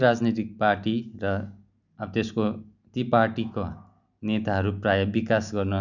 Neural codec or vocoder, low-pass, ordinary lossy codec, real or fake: none; 7.2 kHz; none; real